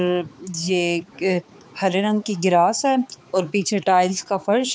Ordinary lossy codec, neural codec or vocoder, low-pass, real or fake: none; codec, 16 kHz, 4 kbps, X-Codec, HuBERT features, trained on balanced general audio; none; fake